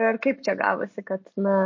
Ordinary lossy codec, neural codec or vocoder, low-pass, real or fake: MP3, 32 kbps; none; 7.2 kHz; real